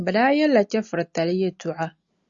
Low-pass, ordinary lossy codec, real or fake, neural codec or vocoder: 7.2 kHz; Opus, 64 kbps; real; none